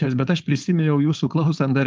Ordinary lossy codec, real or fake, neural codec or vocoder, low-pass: Opus, 24 kbps; fake; codec, 16 kHz, 4.8 kbps, FACodec; 7.2 kHz